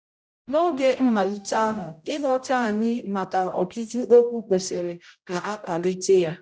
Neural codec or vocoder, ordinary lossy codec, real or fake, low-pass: codec, 16 kHz, 0.5 kbps, X-Codec, HuBERT features, trained on general audio; none; fake; none